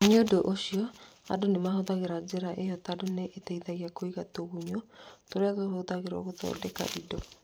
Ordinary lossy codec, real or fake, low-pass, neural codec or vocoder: none; fake; none; vocoder, 44.1 kHz, 128 mel bands every 512 samples, BigVGAN v2